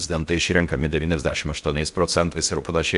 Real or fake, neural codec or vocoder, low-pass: fake; codec, 16 kHz in and 24 kHz out, 0.6 kbps, FocalCodec, streaming, 4096 codes; 10.8 kHz